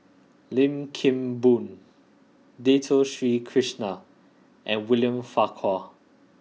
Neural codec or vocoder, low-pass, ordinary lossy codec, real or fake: none; none; none; real